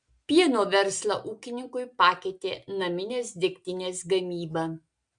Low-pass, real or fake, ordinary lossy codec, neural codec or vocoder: 9.9 kHz; real; MP3, 64 kbps; none